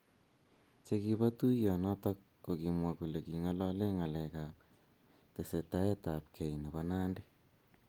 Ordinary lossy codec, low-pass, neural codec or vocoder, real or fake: Opus, 24 kbps; 19.8 kHz; none; real